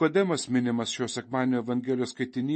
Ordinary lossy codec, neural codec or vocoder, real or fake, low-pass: MP3, 32 kbps; none; real; 9.9 kHz